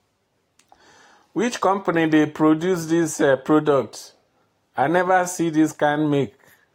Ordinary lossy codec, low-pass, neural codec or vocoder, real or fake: AAC, 48 kbps; 19.8 kHz; none; real